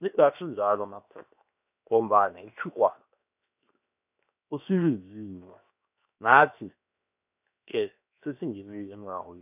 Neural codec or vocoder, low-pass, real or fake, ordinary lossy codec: codec, 16 kHz, 0.7 kbps, FocalCodec; 3.6 kHz; fake; none